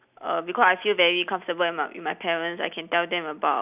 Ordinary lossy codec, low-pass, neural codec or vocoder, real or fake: none; 3.6 kHz; none; real